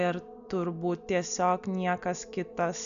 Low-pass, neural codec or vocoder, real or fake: 7.2 kHz; none; real